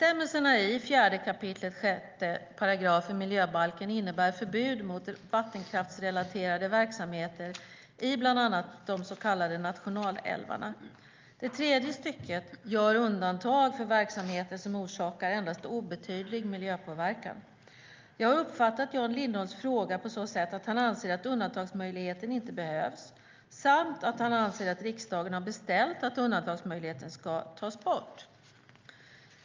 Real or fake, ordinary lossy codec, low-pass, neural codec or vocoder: real; Opus, 24 kbps; 7.2 kHz; none